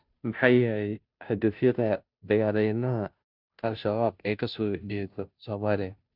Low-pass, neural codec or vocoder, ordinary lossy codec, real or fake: 5.4 kHz; codec, 16 kHz, 0.5 kbps, FunCodec, trained on Chinese and English, 25 frames a second; none; fake